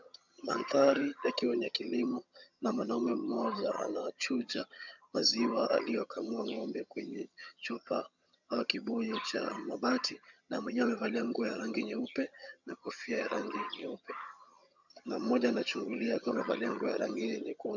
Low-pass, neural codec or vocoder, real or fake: 7.2 kHz; vocoder, 22.05 kHz, 80 mel bands, HiFi-GAN; fake